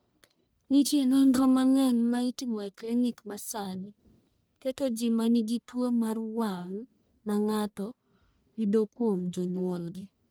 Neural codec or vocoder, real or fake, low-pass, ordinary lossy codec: codec, 44.1 kHz, 1.7 kbps, Pupu-Codec; fake; none; none